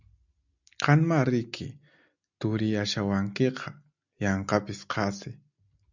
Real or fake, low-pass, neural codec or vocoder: real; 7.2 kHz; none